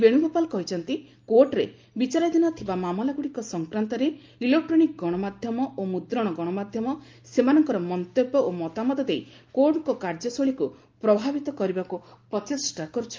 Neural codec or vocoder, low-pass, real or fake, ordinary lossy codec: none; 7.2 kHz; real; Opus, 24 kbps